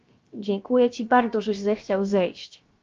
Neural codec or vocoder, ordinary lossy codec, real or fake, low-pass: codec, 16 kHz, about 1 kbps, DyCAST, with the encoder's durations; Opus, 24 kbps; fake; 7.2 kHz